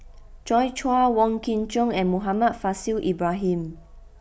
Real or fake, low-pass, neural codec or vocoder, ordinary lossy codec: real; none; none; none